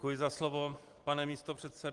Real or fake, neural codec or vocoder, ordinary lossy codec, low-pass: real; none; Opus, 24 kbps; 10.8 kHz